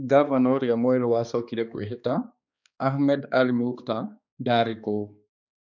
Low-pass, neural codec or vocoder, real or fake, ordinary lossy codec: 7.2 kHz; codec, 16 kHz, 2 kbps, X-Codec, HuBERT features, trained on balanced general audio; fake; none